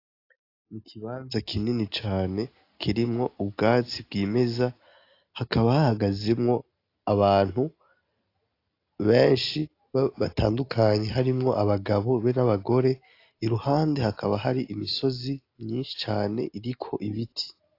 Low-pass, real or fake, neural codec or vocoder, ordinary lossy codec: 5.4 kHz; real; none; AAC, 32 kbps